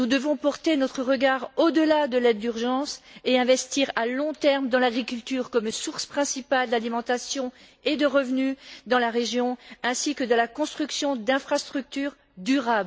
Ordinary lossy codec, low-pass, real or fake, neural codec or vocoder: none; none; real; none